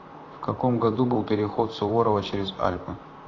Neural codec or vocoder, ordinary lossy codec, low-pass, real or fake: vocoder, 44.1 kHz, 128 mel bands, Pupu-Vocoder; AAC, 32 kbps; 7.2 kHz; fake